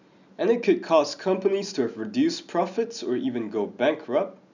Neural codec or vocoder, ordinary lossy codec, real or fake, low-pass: none; none; real; 7.2 kHz